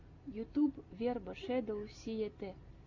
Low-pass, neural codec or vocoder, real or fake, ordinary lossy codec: 7.2 kHz; none; real; AAC, 48 kbps